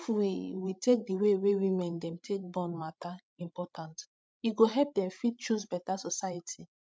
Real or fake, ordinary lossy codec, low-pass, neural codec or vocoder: fake; none; none; codec, 16 kHz, 16 kbps, FreqCodec, larger model